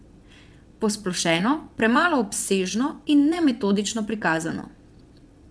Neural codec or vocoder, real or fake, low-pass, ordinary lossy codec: vocoder, 22.05 kHz, 80 mel bands, WaveNeXt; fake; none; none